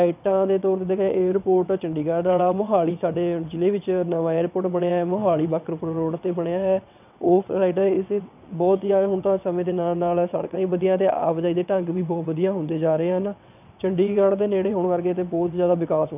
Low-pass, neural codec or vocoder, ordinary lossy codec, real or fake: 3.6 kHz; vocoder, 22.05 kHz, 80 mel bands, WaveNeXt; none; fake